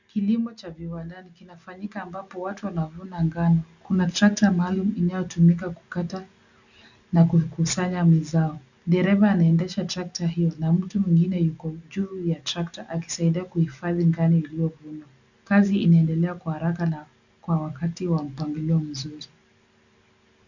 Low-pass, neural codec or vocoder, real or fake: 7.2 kHz; none; real